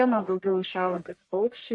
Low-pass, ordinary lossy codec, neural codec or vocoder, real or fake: 10.8 kHz; AAC, 64 kbps; codec, 44.1 kHz, 1.7 kbps, Pupu-Codec; fake